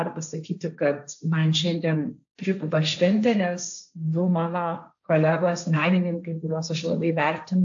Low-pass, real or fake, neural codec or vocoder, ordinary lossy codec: 7.2 kHz; fake; codec, 16 kHz, 1.1 kbps, Voila-Tokenizer; AAC, 64 kbps